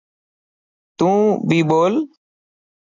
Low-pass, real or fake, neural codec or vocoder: 7.2 kHz; real; none